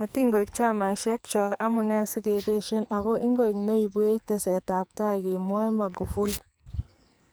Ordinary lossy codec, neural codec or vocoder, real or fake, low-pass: none; codec, 44.1 kHz, 2.6 kbps, SNAC; fake; none